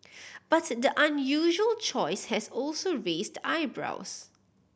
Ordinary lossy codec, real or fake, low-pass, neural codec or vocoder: none; real; none; none